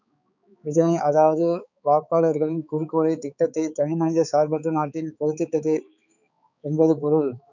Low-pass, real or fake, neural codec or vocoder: 7.2 kHz; fake; codec, 16 kHz, 4 kbps, X-Codec, HuBERT features, trained on balanced general audio